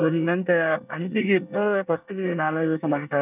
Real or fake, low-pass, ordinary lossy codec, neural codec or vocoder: fake; 3.6 kHz; none; codec, 24 kHz, 1 kbps, SNAC